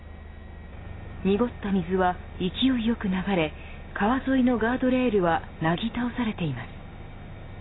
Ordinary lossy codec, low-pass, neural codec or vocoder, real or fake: AAC, 16 kbps; 7.2 kHz; none; real